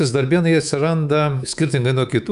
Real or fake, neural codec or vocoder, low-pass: fake; codec, 24 kHz, 3.1 kbps, DualCodec; 10.8 kHz